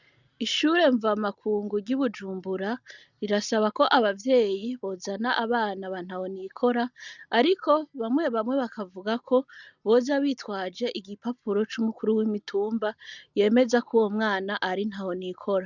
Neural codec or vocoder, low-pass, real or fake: none; 7.2 kHz; real